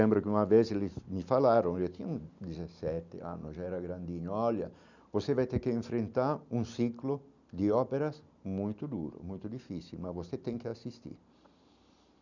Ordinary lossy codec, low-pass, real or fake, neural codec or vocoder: none; 7.2 kHz; real; none